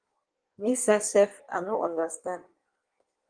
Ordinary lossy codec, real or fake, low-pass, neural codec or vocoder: Opus, 24 kbps; fake; 9.9 kHz; codec, 16 kHz in and 24 kHz out, 1.1 kbps, FireRedTTS-2 codec